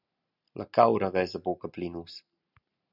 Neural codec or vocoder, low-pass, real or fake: none; 5.4 kHz; real